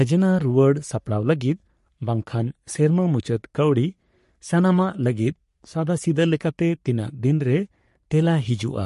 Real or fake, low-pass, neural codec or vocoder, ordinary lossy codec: fake; 14.4 kHz; codec, 44.1 kHz, 3.4 kbps, Pupu-Codec; MP3, 48 kbps